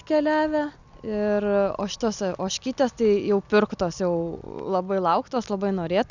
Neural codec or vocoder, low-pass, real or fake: none; 7.2 kHz; real